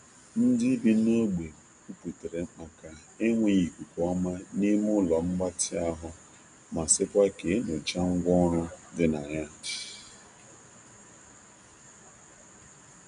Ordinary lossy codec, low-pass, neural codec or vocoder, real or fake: none; 9.9 kHz; none; real